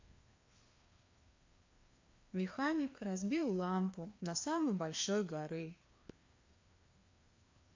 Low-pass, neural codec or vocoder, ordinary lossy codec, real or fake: 7.2 kHz; codec, 16 kHz, 2 kbps, FreqCodec, larger model; MP3, 48 kbps; fake